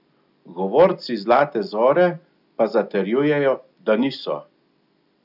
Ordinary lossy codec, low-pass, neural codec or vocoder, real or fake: none; 5.4 kHz; none; real